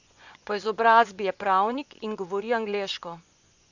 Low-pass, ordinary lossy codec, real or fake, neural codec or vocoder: 7.2 kHz; none; fake; vocoder, 24 kHz, 100 mel bands, Vocos